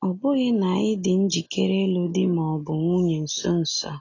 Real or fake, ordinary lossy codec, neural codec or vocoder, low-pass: real; AAC, 32 kbps; none; 7.2 kHz